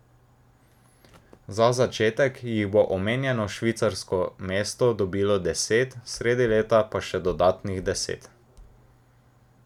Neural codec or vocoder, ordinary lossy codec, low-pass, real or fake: none; none; 19.8 kHz; real